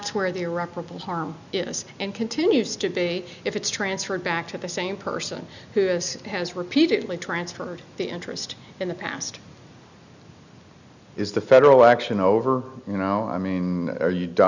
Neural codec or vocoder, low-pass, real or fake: none; 7.2 kHz; real